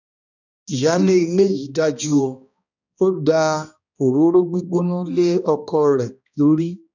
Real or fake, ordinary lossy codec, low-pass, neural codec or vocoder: fake; none; 7.2 kHz; codec, 16 kHz, 1 kbps, X-Codec, HuBERT features, trained on balanced general audio